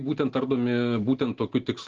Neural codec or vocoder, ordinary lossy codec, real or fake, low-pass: none; Opus, 16 kbps; real; 7.2 kHz